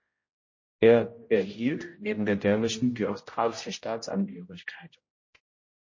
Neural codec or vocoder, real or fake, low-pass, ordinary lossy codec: codec, 16 kHz, 0.5 kbps, X-Codec, HuBERT features, trained on general audio; fake; 7.2 kHz; MP3, 32 kbps